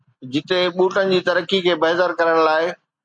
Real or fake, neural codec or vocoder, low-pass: real; none; 9.9 kHz